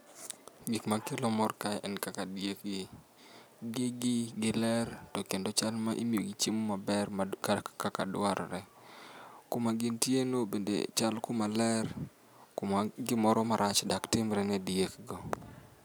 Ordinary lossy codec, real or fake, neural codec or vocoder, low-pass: none; real; none; none